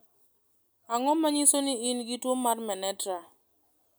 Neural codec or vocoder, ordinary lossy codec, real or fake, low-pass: none; none; real; none